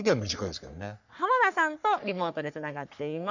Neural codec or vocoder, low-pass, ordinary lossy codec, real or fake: codec, 44.1 kHz, 3.4 kbps, Pupu-Codec; 7.2 kHz; none; fake